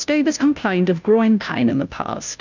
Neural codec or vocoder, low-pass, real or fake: codec, 16 kHz, 0.5 kbps, FunCodec, trained on Chinese and English, 25 frames a second; 7.2 kHz; fake